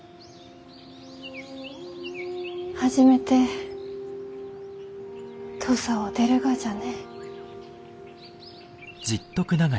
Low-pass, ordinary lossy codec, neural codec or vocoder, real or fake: none; none; none; real